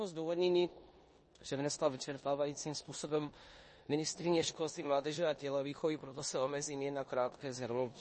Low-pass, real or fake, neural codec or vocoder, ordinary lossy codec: 10.8 kHz; fake; codec, 16 kHz in and 24 kHz out, 0.9 kbps, LongCat-Audio-Codec, four codebook decoder; MP3, 32 kbps